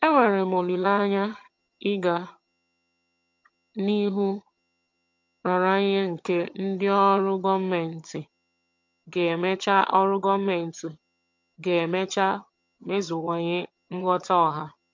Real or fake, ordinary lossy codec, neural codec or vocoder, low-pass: fake; MP3, 48 kbps; vocoder, 22.05 kHz, 80 mel bands, HiFi-GAN; 7.2 kHz